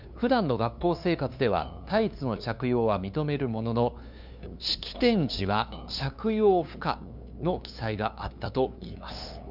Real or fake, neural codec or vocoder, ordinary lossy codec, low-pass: fake; codec, 16 kHz, 2 kbps, FunCodec, trained on LibriTTS, 25 frames a second; none; 5.4 kHz